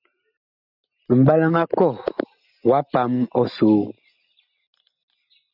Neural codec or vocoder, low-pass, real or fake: none; 5.4 kHz; real